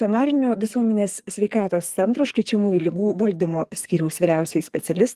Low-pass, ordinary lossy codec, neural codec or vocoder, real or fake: 14.4 kHz; Opus, 24 kbps; codec, 44.1 kHz, 2.6 kbps, SNAC; fake